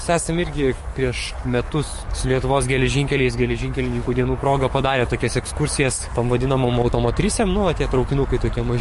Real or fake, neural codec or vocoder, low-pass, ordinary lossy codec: fake; codec, 44.1 kHz, 7.8 kbps, DAC; 14.4 kHz; MP3, 48 kbps